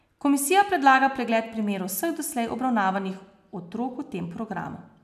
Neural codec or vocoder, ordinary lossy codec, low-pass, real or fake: none; none; 14.4 kHz; real